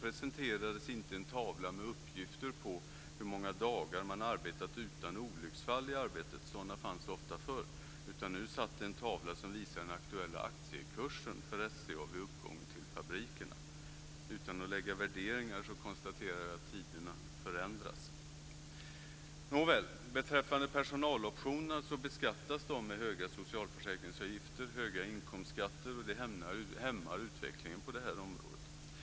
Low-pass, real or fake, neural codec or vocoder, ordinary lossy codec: none; real; none; none